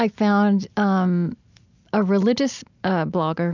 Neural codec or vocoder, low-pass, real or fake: none; 7.2 kHz; real